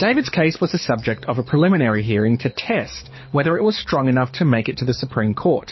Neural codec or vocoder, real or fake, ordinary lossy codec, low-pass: codec, 24 kHz, 6 kbps, HILCodec; fake; MP3, 24 kbps; 7.2 kHz